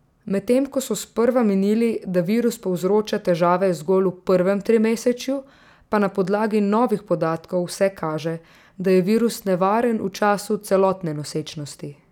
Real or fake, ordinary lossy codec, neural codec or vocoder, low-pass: real; none; none; 19.8 kHz